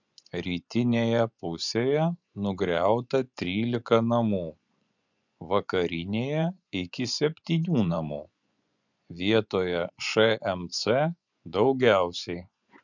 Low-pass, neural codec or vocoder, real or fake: 7.2 kHz; vocoder, 24 kHz, 100 mel bands, Vocos; fake